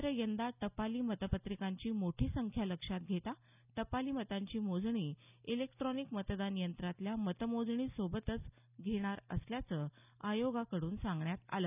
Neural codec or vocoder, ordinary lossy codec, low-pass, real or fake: none; none; 3.6 kHz; real